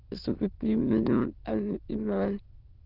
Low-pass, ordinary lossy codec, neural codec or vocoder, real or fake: 5.4 kHz; Opus, 16 kbps; autoencoder, 22.05 kHz, a latent of 192 numbers a frame, VITS, trained on many speakers; fake